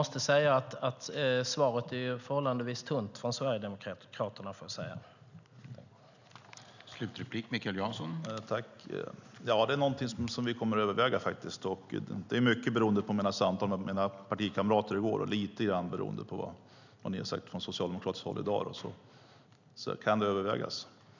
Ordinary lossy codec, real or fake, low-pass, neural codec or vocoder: none; real; 7.2 kHz; none